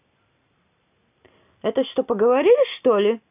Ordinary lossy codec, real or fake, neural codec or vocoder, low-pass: none; real; none; 3.6 kHz